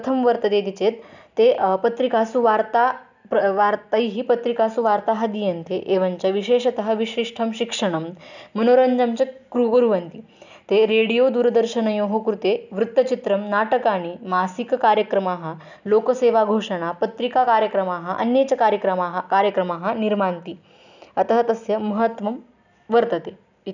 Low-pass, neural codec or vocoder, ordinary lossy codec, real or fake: 7.2 kHz; none; none; real